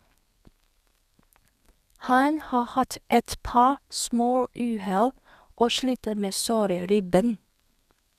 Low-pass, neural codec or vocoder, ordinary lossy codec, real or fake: 14.4 kHz; codec, 32 kHz, 1.9 kbps, SNAC; none; fake